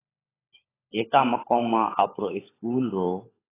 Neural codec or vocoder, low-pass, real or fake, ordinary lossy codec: codec, 16 kHz, 16 kbps, FunCodec, trained on LibriTTS, 50 frames a second; 3.6 kHz; fake; AAC, 16 kbps